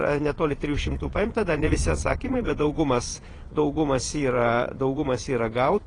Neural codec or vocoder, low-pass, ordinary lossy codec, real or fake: vocoder, 22.05 kHz, 80 mel bands, Vocos; 9.9 kHz; AAC, 32 kbps; fake